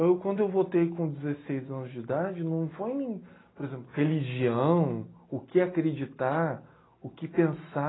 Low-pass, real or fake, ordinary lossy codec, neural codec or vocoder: 7.2 kHz; real; AAC, 16 kbps; none